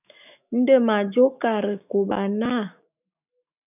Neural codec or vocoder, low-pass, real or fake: autoencoder, 48 kHz, 128 numbers a frame, DAC-VAE, trained on Japanese speech; 3.6 kHz; fake